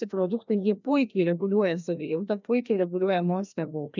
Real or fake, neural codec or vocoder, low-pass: fake; codec, 16 kHz, 1 kbps, FreqCodec, larger model; 7.2 kHz